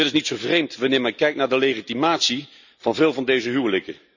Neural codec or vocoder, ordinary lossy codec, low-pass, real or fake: none; none; 7.2 kHz; real